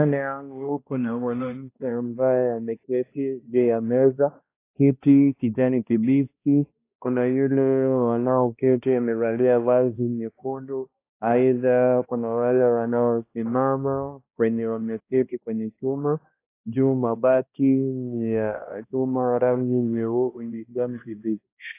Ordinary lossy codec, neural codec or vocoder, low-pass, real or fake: AAC, 24 kbps; codec, 16 kHz, 1 kbps, X-Codec, HuBERT features, trained on balanced general audio; 3.6 kHz; fake